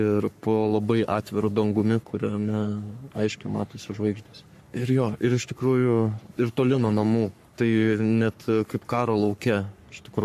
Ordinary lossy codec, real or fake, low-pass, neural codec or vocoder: MP3, 64 kbps; fake; 14.4 kHz; codec, 44.1 kHz, 3.4 kbps, Pupu-Codec